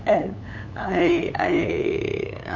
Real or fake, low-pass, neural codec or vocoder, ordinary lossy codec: fake; 7.2 kHz; codec, 16 kHz, 8 kbps, FunCodec, trained on LibriTTS, 25 frames a second; none